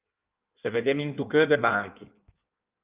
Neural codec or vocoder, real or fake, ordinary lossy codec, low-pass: codec, 16 kHz in and 24 kHz out, 1.1 kbps, FireRedTTS-2 codec; fake; Opus, 24 kbps; 3.6 kHz